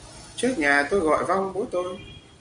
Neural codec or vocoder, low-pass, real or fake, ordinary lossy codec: none; 9.9 kHz; real; MP3, 48 kbps